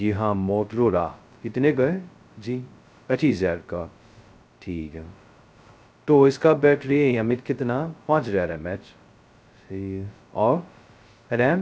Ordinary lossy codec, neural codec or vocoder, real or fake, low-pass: none; codec, 16 kHz, 0.2 kbps, FocalCodec; fake; none